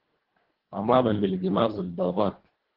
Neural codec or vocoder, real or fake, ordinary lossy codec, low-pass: codec, 24 kHz, 1.5 kbps, HILCodec; fake; Opus, 16 kbps; 5.4 kHz